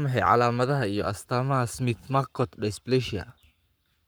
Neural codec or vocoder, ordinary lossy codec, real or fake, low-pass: codec, 44.1 kHz, 7.8 kbps, Pupu-Codec; none; fake; none